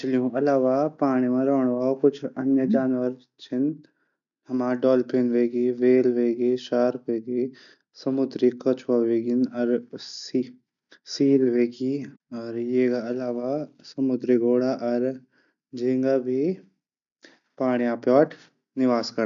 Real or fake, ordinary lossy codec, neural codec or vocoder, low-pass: real; none; none; 7.2 kHz